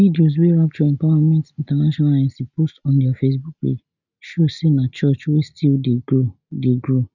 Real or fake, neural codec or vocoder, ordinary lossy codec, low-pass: real; none; none; 7.2 kHz